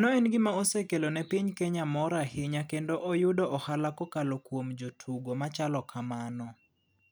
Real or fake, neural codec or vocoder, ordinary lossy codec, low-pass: real; none; none; none